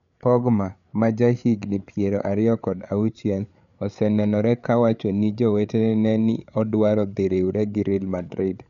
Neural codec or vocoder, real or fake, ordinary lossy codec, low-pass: codec, 16 kHz, 8 kbps, FreqCodec, larger model; fake; none; 7.2 kHz